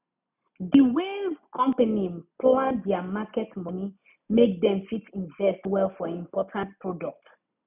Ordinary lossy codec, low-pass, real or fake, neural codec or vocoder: none; 3.6 kHz; real; none